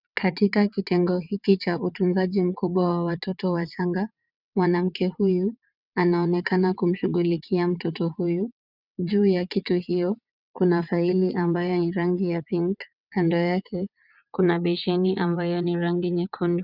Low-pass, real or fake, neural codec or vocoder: 5.4 kHz; fake; vocoder, 22.05 kHz, 80 mel bands, WaveNeXt